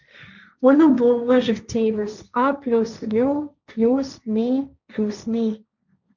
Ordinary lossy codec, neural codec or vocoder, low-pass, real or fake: none; codec, 16 kHz, 1.1 kbps, Voila-Tokenizer; 7.2 kHz; fake